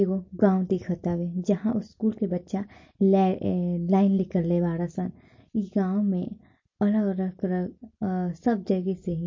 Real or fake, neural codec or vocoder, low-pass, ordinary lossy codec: real; none; 7.2 kHz; MP3, 32 kbps